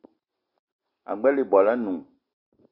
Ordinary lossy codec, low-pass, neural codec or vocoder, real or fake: Opus, 64 kbps; 5.4 kHz; none; real